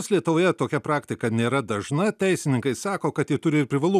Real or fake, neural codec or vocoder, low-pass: real; none; 14.4 kHz